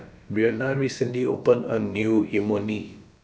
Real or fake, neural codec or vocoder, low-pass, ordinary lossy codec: fake; codec, 16 kHz, about 1 kbps, DyCAST, with the encoder's durations; none; none